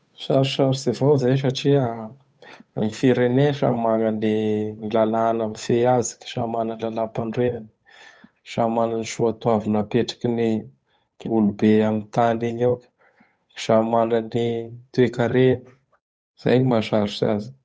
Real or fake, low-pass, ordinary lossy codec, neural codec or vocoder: fake; none; none; codec, 16 kHz, 8 kbps, FunCodec, trained on Chinese and English, 25 frames a second